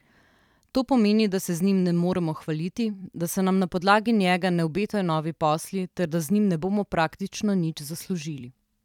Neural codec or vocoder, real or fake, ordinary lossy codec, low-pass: vocoder, 44.1 kHz, 128 mel bands every 256 samples, BigVGAN v2; fake; none; 19.8 kHz